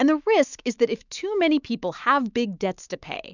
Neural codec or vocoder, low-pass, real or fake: autoencoder, 48 kHz, 128 numbers a frame, DAC-VAE, trained on Japanese speech; 7.2 kHz; fake